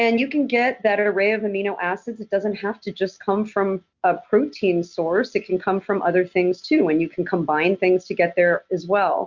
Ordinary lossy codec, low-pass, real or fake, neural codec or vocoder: Opus, 64 kbps; 7.2 kHz; real; none